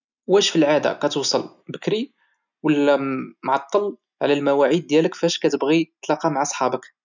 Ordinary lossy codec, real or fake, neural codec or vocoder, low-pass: none; real; none; 7.2 kHz